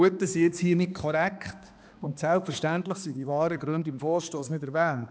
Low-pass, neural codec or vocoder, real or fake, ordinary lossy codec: none; codec, 16 kHz, 2 kbps, X-Codec, HuBERT features, trained on balanced general audio; fake; none